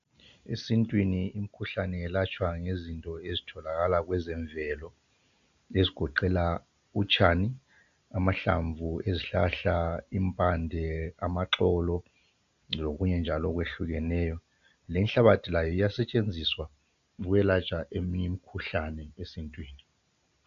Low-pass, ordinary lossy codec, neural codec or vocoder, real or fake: 7.2 kHz; AAC, 64 kbps; none; real